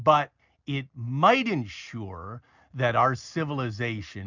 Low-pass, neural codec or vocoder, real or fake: 7.2 kHz; none; real